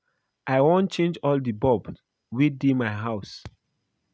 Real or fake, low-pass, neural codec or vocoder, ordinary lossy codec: real; none; none; none